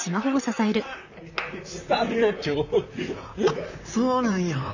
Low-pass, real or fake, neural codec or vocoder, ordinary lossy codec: 7.2 kHz; fake; vocoder, 44.1 kHz, 128 mel bands, Pupu-Vocoder; none